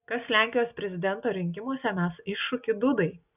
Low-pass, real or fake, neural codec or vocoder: 3.6 kHz; real; none